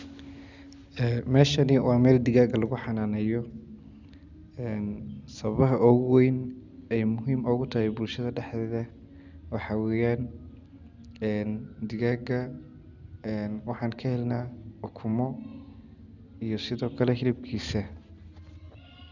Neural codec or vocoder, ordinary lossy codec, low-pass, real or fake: none; none; 7.2 kHz; real